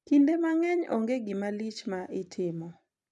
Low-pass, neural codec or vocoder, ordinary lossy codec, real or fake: 10.8 kHz; none; none; real